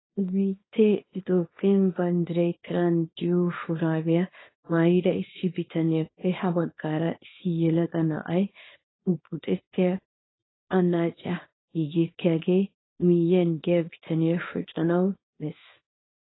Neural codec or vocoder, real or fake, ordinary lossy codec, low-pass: codec, 24 kHz, 0.9 kbps, WavTokenizer, small release; fake; AAC, 16 kbps; 7.2 kHz